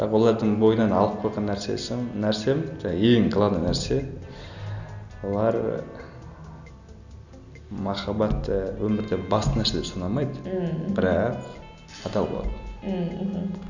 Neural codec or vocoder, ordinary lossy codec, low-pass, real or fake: none; none; 7.2 kHz; real